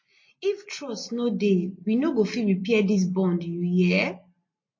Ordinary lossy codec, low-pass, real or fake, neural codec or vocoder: MP3, 32 kbps; 7.2 kHz; real; none